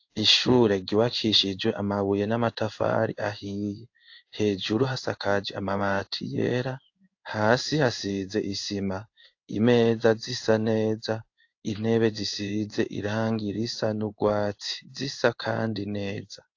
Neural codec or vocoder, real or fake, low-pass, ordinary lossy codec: codec, 16 kHz in and 24 kHz out, 1 kbps, XY-Tokenizer; fake; 7.2 kHz; AAC, 48 kbps